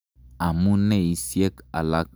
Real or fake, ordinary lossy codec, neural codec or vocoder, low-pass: real; none; none; none